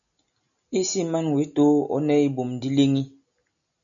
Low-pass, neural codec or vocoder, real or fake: 7.2 kHz; none; real